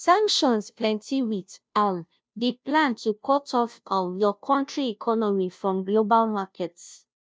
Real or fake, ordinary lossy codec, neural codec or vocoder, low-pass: fake; none; codec, 16 kHz, 0.5 kbps, FunCodec, trained on Chinese and English, 25 frames a second; none